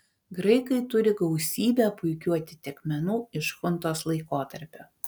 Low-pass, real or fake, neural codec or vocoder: 19.8 kHz; real; none